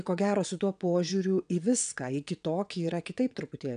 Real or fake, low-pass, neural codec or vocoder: fake; 9.9 kHz; vocoder, 22.05 kHz, 80 mel bands, WaveNeXt